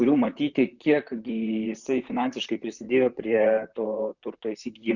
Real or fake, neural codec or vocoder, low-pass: fake; vocoder, 44.1 kHz, 128 mel bands, Pupu-Vocoder; 7.2 kHz